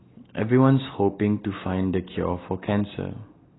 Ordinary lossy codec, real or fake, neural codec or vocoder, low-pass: AAC, 16 kbps; real; none; 7.2 kHz